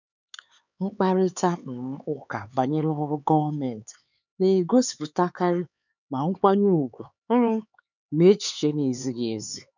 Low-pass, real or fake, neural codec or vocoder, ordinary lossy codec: 7.2 kHz; fake; codec, 16 kHz, 4 kbps, X-Codec, HuBERT features, trained on LibriSpeech; none